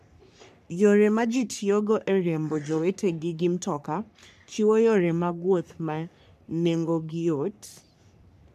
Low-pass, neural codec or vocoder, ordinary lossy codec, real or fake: 14.4 kHz; codec, 44.1 kHz, 3.4 kbps, Pupu-Codec; none; fake